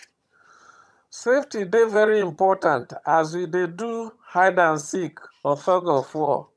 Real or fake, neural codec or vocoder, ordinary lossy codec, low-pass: fake; vocoder, 22.05 kHz, 80 mel bands, HiFi-GAN; none; none